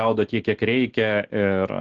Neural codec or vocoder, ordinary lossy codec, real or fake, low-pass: none; Opus, 16 kbps; real; 7.2 kHz